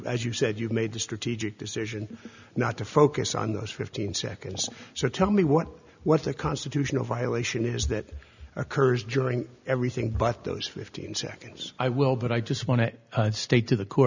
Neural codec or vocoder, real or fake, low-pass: none; real; 7.2 kHz